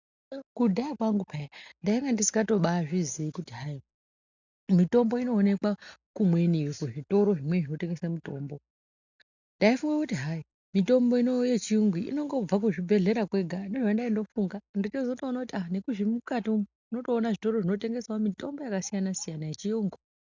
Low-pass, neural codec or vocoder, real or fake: 7.2 kHz; none; real